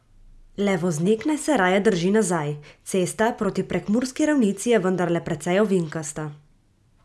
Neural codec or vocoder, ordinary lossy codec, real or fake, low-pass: none; none; real; none